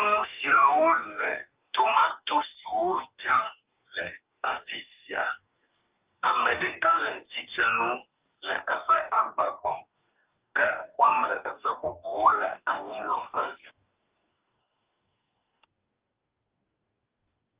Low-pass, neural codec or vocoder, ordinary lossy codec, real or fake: 3.6 kHz; codec, 44.1 kHz, 2.6 kbps, DAC; Opus, 24 kbps; fake